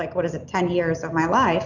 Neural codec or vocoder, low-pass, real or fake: none; 7.2 kHz; real